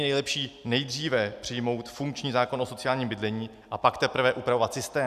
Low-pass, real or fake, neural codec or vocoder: 14.4 kHz; real; none